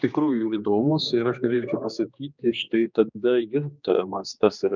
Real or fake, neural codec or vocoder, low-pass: fake; codec, 16 kHz, 2 kbps, X-Codec, HuBERT features, trained on general audio; 7.2 kHz